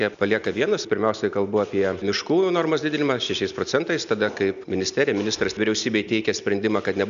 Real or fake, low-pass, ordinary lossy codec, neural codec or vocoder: real; 7.2 kHz; AAC, 96 kbps; none